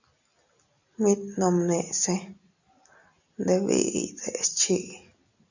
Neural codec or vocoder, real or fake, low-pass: none; real; 7.2 kHz